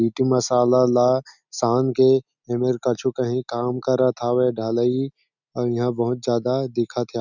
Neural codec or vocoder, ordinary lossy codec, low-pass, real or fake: none; none; 7.2 kHz; real